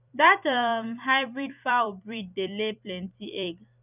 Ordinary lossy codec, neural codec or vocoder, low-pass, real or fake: none; vocoder, 44.1 kHz, 128 mel bands every 256 samples, BigVGAN v2; 3.6 kHz; fake